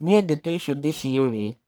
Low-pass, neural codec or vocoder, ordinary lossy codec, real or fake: none; codec, 44.1 kHz, 1.7 kbps, Pupu-Codec; none; fake